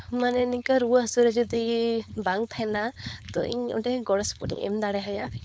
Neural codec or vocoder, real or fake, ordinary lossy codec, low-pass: codec, 16 kHz, 4.8 kbps, FACodec; fake; none; none